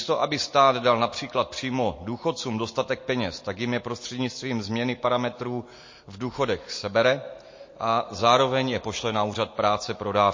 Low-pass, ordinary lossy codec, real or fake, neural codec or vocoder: 7.2 kHz; MP3, 32 kbps; real; none